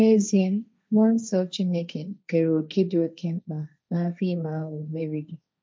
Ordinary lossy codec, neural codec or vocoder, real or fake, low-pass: none; codec, 16 kHz, 1.1 kbps, Voila-Tokenizer; fake; none